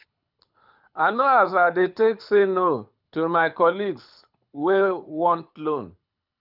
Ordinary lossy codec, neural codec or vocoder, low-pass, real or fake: none; codec, 24 kHz, 6 kbps, HILCodec; 5.4 kHz; fake